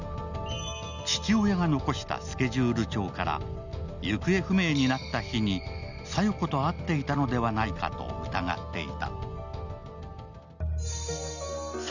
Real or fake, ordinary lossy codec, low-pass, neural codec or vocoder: real; none; 7.2 kHz; none